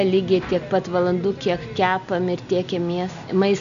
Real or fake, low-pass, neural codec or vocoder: real; 7.2 kHz; none